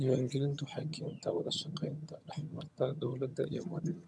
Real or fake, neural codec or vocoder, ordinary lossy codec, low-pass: fake; vocoder, 22.05 kHz, 80 mel bands, HiFi-GAN; none; none